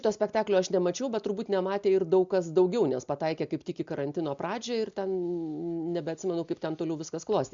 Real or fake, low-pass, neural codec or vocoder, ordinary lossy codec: real; 7.2 kHz; none; MP3, 64 kbps